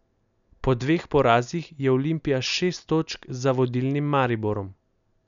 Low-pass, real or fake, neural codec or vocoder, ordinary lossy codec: 7.2 kHz; real; none; none